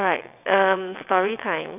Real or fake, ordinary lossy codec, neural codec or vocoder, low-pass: fake; none; vocoder, 22.05 kHz, 80 mel bands, WaveNeXt; 3.6 kHz